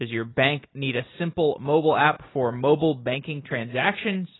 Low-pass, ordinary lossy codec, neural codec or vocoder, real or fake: 7.2 kHz; AAC, 16 kbps; none; real